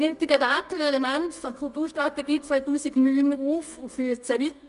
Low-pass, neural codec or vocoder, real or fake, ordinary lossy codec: 10.8 kHz; codec, 24 kHz, 0.9 kbps, WavTokenizer, medium music audio release; fake; none